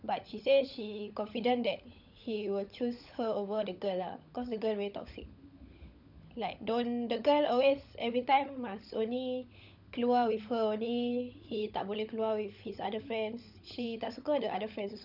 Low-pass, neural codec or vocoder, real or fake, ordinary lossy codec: 5.4 kHz; codec, 16 kHz, 16 kbps, FunCodec, trained on LibriTTS, 50 frames a second; fake; none